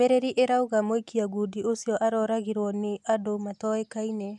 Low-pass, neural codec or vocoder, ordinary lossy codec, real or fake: none; none; none; real